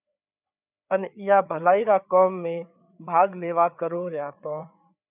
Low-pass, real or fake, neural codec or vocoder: 3.6 kHz; fake; codec, 16 kHz, 4 kbps, FreqCodec, larger model